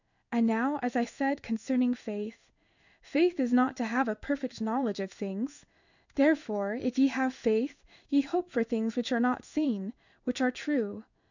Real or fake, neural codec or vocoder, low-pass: fake; codec, 16 kHz in and 24 kHz out, 1 kbps, XY-Tokenizer; 7.2 kHz